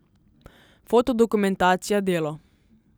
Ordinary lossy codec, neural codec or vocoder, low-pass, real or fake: none; none; none; real